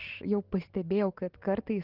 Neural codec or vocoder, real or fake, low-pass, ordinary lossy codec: none; real; 5.4 kHz; Opus, 16 kbps